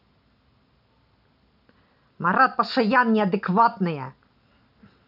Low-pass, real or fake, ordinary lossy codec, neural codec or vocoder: 5.4 kHz; real; none; none